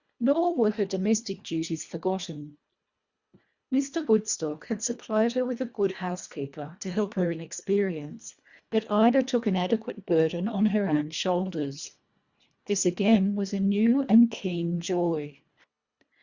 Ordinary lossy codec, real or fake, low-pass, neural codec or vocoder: Opus, 64 kbps; fake; 7.2 kHz; codec, 24 kHz, 1.5 kbps, HILCodec